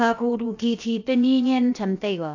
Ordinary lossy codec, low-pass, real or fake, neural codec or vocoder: AAC, 48 kbps; 7.2 kHz; fake; codec, 16 kHz, about 1 kbps, DyCAST, with the encoder's durations